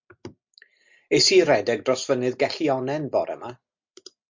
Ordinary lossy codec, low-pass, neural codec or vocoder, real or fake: MP3, 48 kbps; 7.2 kHz; none; real